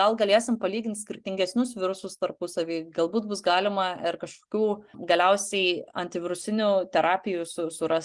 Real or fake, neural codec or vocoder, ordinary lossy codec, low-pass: real; none; Opus, 24 kbps; 10.8 kHz